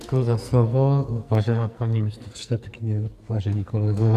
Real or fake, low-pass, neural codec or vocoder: fake; 14.4 kHz; codec, 44.1 kHz, 2.6 kbps, SNAC